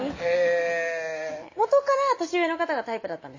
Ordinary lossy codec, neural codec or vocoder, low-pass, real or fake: MP3, 32 kbps; none; 7.2 kHz; real